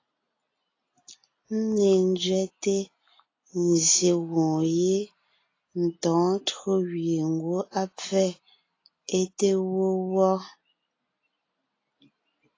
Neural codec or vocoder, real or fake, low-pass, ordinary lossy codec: none; real; 7.2 kHz; AAC, 32 kbps